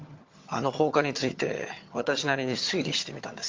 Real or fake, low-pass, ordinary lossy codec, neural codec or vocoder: fake; 7.2 kHz; Opus, 32 kbps; vocoder, 22.05 kHz, 80 mel bands, HiFi-GAN